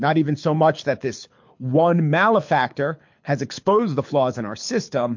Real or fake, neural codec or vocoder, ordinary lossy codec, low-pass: fake; codec, 24 kHz, 6 kbps, HILCodec; MP3, 48 kbps; 7.2 kHz